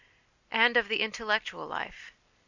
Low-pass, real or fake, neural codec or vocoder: 7.2 kHz; real; none